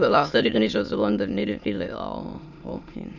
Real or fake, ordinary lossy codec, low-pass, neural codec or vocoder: fake; none; 7.2 kHz; autoencoder, 22.05 kHz, a latent of 192 numbers a frame, VITS, trained on many speakers